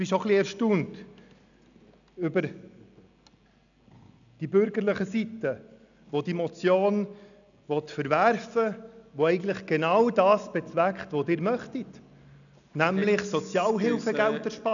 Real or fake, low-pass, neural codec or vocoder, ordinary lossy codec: real; 7.2 kHz; none; none